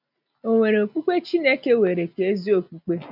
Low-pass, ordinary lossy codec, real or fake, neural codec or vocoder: 5.4 kHz; none; real; none